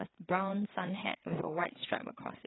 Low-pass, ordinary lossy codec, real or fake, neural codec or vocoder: 7.2 kHz; AAC, 16 kbps; fake; codec, 16 kHz, 4 kbps, FreqCodec, larger model